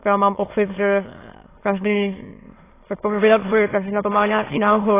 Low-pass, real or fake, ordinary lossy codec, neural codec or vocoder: 3.6 kHz; fake; AAC, 16 kbps; autoencoder, 22.05 kHz, a latent of 192 numbers a frame, VITS, trained on many speakers